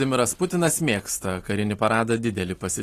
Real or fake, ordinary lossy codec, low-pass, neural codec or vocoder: real; AAC, 48 kbps; 14.4 kHz; none